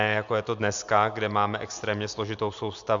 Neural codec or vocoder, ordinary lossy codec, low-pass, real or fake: none; MP3, 64 kbps; 7.2 kHz; real